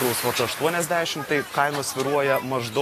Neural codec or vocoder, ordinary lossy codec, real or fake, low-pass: none; AAC, 48 kbps; real; 14.4 kHz